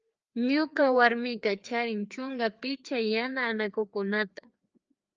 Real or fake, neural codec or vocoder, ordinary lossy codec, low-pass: fake; codec, 16 kHz, 2 kbps, FreqCodec, larger model; Opus, 24 kbps; 7.2 kHz